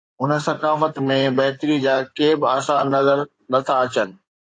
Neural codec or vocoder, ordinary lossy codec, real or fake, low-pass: codec, 16 kHz in and 24 kHz out, 2.2 kbps, FireRedTTS-2 codec; AAC, 48 kbps; fake; 9.9 kHz